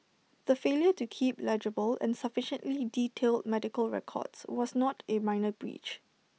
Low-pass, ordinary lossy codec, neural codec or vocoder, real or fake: none; none; none; real